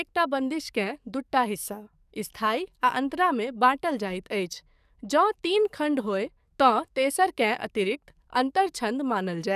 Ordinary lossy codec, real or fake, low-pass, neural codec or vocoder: none; fake; 14.4 kHz; codec, 44.1 kHz, 7.8 kbps, DAC